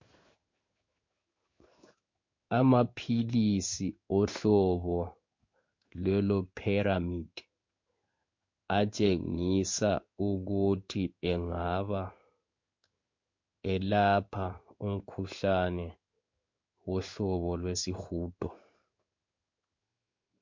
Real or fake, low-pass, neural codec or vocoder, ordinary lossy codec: fake; 7.2 kHz; codec, 16 kHz in and 24 kHz out, 1 kbps, XY-Tokenizer; MP3, 48 kbps